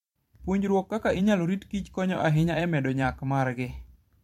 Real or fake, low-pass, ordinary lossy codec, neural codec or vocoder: fake; 19.8 kHz; MP3, 64 kbps; vocoder, 44.1 kHz, 128 mel bands every 512 samples, BigVGAN v2